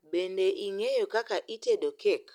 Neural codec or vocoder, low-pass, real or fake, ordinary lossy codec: none; 19.8 kHz; real; none